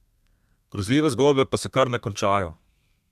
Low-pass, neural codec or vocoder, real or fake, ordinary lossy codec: 14.4 kHz; codec, 32 kHz, 1.9 kbps, SNAC; fake; MP3, 96 kbps